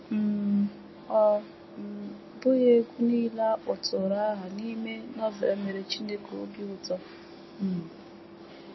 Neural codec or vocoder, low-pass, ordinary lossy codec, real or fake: none; 7.2 kHz; MP3, 24 kbps; real